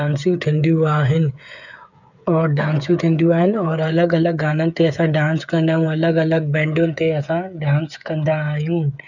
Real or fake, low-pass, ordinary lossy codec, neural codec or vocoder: fake; 7.2 kHz; none; codec, 16 kHz, 8 kbps, FreqCodec, smaller model